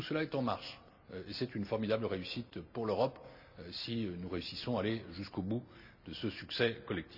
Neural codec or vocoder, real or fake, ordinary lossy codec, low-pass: none; real; none; 5.4 kHz